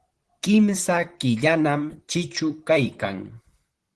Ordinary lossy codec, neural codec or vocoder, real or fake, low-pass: Opus, 16 kbps; vocoder, 44.1 kHz, 128 mel bands, Pupu-Vocoder; fake; 10.8 kHz